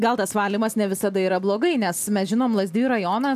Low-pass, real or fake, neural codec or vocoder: 14.4 kHz; real; none